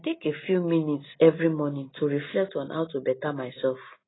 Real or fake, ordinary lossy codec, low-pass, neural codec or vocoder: real; AAC, 16 kbps; 7.2 kHz; none